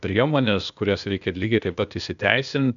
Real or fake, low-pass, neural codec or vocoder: fake; 7.2 kHz; codec, 16 kHz, 0.8 kbps, ZipCodec